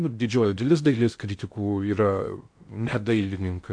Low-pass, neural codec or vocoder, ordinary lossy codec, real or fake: 9.9 kHz; codec, 16 kHz in and 24 kHz out, 0.6 kbps, FocalCodec, streaming, 2048 codes; MP3, 64 kbps; fake